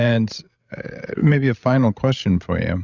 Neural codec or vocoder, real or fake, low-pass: codec, 16 kHz, 16 kbps, FreqCodec, larger model; fake; 7.2 kHz